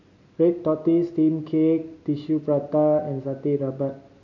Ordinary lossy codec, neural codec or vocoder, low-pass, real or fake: none; none; 7.2 kHz; real